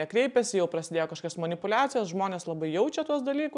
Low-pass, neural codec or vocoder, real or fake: 10.8 kHz; none; real